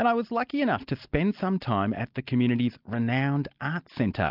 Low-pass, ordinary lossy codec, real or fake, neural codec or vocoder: 5.4 kHz; Opus, 24 kbps; real; none